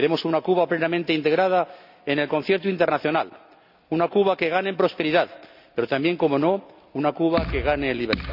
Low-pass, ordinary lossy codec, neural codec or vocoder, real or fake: 5.4 kHz; none; none; real